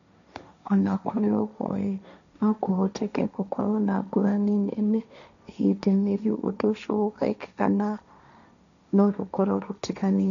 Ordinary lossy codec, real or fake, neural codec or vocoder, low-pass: none; fake; codec, 16 kHz, 1.1 kbps, Voila-Tokenizer; 7.2 kHz